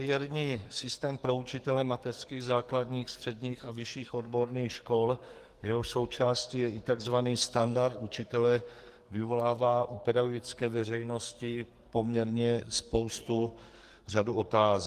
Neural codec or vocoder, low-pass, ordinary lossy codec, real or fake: codec, 44.1 kHz, 2.6 kbps, SNAC; 14.4 kHz; Opus, 24 kbps; fake